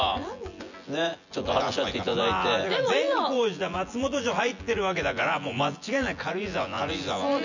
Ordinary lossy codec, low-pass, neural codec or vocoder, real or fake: none; 7.2 kHz; vocoder, 24 kHz, 100 mel bands, Vocos; fake